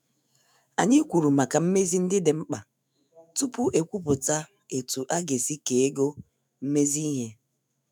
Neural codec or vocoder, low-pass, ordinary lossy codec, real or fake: autoencoder, 48 kHz, 128 numbers a frame, DAC-VAE, trained on Japanese speech; none; none; fake